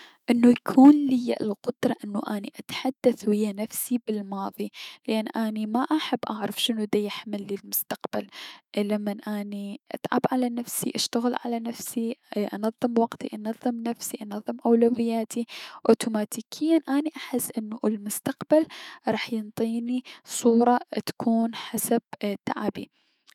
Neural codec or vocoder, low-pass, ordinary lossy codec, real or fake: autoencoder, 48 kHz, 128 numbers a frame, DAC-VAE, trained on Japanese speech; 19.8 kHz; none; fake